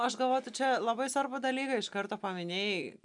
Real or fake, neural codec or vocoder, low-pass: real; none; 10.8 kHz